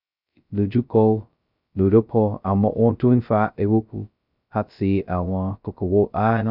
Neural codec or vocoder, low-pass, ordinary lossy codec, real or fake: codec, 16 kHz, 0.2 kbps, FocalCodec; 5.4 kHz; none; fake